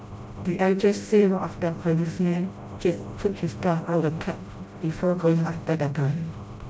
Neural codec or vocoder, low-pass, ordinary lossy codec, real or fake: codec, 16 kHz, 0.5 kbps, FreqCodec, smaller model; none; none; fake